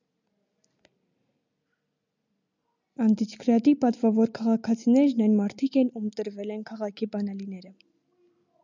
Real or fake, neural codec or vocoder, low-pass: real; none; 7.2 kHz